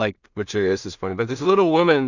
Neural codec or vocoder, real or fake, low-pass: codec, 16 kHz in and 24 kHz out, 0.4 kbps, LongCat-Audio-Codec, two codebook decoder; fake; 7.2 kHz